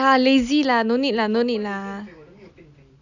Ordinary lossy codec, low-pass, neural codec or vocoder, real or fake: none; 7.2 kHz; none; real